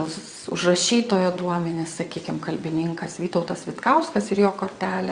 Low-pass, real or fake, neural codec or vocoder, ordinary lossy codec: 9.9 kHz; fake; vocoder, 22.05 kHz, 80 mel bands, WaveNeXt; Opus, 64 kbps